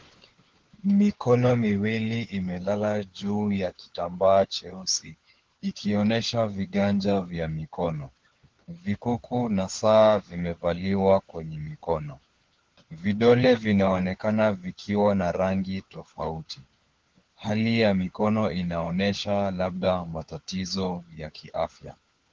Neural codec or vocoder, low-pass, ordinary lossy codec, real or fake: codec, 16 kHz, 4 kbps, FunCodec, trained on LibriTTS, 50 frames a second; 7.2 kHz; Opus, 16 kbps; fake